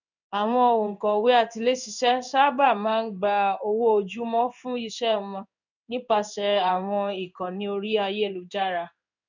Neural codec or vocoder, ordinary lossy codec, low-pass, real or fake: codec, 16 kHz in and 24 kHz out, 1 kbps, XY-Tokenizer; none; 7.2 kHz; fake